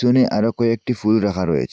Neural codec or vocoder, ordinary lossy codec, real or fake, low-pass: none; none; real; none